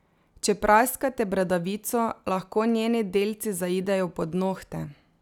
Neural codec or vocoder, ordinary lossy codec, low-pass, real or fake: none; none; 19.8 kHz; real